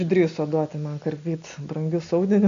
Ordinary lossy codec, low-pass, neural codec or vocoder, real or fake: MP3, 64 kbps; 7.2 kHz; none; real